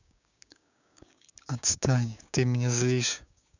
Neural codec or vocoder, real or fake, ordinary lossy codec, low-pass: codec, 16 kHz, 6 kbps, DAC; fake; none; 7.2 kHz